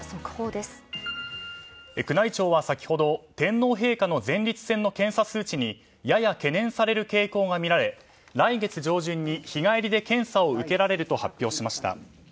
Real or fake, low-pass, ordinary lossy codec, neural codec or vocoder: real; none; none; none